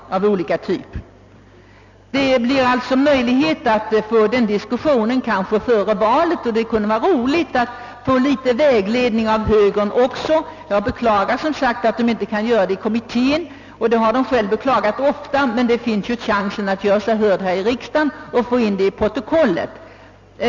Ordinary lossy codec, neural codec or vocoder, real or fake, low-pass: none; none; real; 7.2 kHz